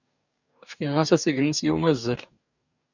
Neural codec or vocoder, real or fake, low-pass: codec, 44.1 kHz, 2.6 kbps, DAC; fake; 7.2 kHz